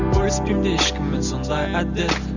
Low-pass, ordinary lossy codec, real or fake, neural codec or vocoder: 7.2 kHz; none; real; none